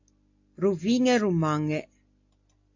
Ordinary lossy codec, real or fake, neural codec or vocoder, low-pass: AAC, 48 kbps; real; none; 7.2 kHz